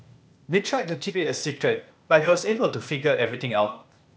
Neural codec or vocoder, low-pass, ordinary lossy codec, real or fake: codec, 16 kHz, 0.8 kbps, ZipCodec; none; none; fake